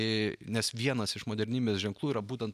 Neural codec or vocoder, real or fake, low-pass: none; real; 14.4 kHz